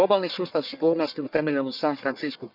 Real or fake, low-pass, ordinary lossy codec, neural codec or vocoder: fake; 5.4 kHz; none; codec, 44.1 kHz, 1.7 kbps, Pupu-Codec